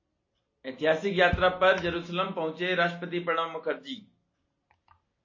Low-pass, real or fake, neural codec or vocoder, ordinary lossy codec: 7.2 kHz; real; none; MP3, 32 kbps